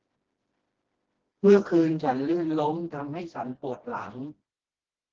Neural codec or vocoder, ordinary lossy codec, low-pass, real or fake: codec, 16 kHz, 1 kbps, FreqCodec, smaller model; Opus, 16 kbps; 7.2 kHz; fake